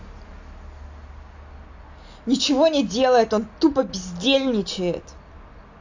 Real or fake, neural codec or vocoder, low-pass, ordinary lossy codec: real; none; 7.2 kHz; AAC, 48 kbps